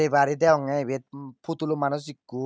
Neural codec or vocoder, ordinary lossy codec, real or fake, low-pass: none; none; real; none